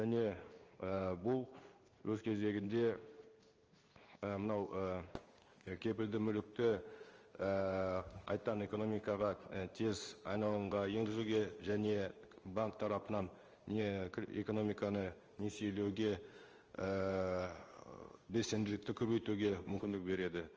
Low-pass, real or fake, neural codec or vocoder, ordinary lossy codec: 7.2 kHz; fake; codec, 16 kHz in and 24 kHz out, 1 kbps, XY-Tokenizer; Opus, 24 kbps